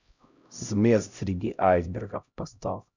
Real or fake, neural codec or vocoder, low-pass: fake; codec, 16 kHz, 0.5 kbps, X-Codec, HuBERT features, trained on LibriSpeech; 7.2 kHz